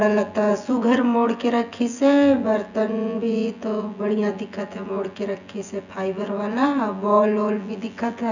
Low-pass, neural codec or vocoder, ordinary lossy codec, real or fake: 7.2 kHz; vocoder, 24 kHz, 100 mel bands, Vocos; none; fake